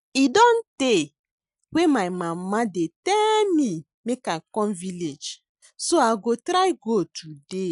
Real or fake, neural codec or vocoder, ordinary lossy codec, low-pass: real; none; MP3, 96 kbps; 10.8 kHz